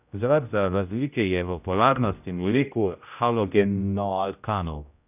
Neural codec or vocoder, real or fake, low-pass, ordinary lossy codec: codec, 16 kHz, 0.5 kbps, X-Codec, HuBERT features, trained on general audio; fake; 3.6 kHz; none